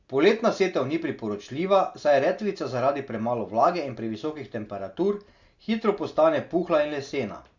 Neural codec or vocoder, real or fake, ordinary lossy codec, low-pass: none; real; Opus, 64 kbps; 7.2 kHz